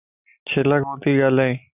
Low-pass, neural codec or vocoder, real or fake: 3.6 kHz; none; real